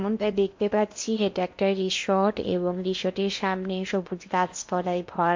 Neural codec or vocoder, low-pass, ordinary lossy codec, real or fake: codec, 16 kHz in and 24 kHz out, 0.8 kbps, FocalCodec, streaming, 65536 codes; 7.2 kHz; MP3, 48 kbps; fake